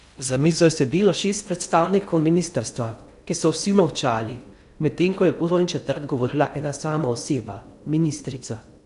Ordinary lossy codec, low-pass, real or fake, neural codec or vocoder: none; 10.8 kHz; fake; codec, 16 kHz in and 24 kHz out, 0.6 kbps, FocalCodec, streaming, 2048 codes